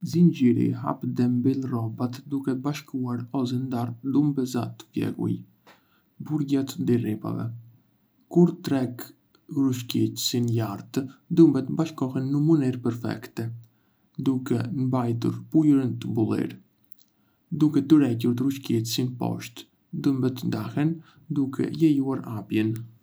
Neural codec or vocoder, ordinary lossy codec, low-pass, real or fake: none; none; none; real